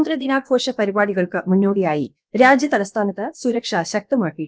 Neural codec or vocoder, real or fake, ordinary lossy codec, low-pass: codec, 16 kHz, about 1 kbps, DyCAST, with the encoder's durations; fake; none; none